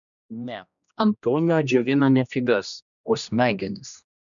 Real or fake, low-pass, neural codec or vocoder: fake; 7.2 kHz; codec, 16 kHz, 1 kbps, X-Codec, HuBERT features, trained on general audio